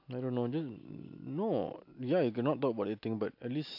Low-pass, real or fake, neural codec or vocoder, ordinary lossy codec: 5.4 kHz; real; none; AAC, 48 kbps